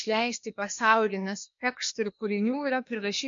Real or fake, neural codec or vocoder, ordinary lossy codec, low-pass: fake; codec, 16 kHz, 0.8 kbps, ZipCodec; MP3, 48 kbps; 7.2 kHz